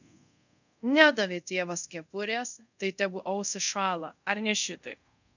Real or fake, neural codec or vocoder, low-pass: fake; codec, 24 kHz, 0.5 kbps, DualCodec; 7.2 kHz